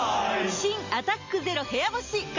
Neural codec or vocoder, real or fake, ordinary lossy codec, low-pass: none; real; MP3, 48 kbps; 7.2 kHz